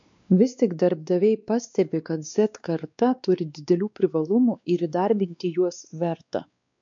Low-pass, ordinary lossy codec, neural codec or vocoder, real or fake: 7.2 kHz; MP3, 64 kbps; codec, 16 kHz, 2 kbps, X-Codec, WavLM features, trained on Multilingual LibriSpeech; fake